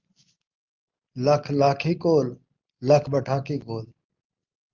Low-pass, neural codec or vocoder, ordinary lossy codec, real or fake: 7.2 kHz; none; Opus, 24 kbps; real